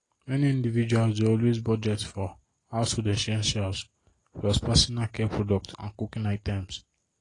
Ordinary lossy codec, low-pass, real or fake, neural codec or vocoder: AAC, 32 kbps; 10.8 kHz; real; none